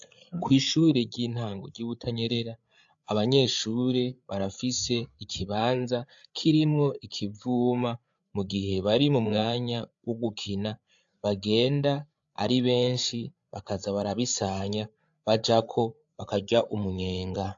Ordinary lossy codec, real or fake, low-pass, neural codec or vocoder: AAC, 64 kbps; fake; 7.2 kHz; codec, 16 kHz, 8 kbps, FreqCodec, larger model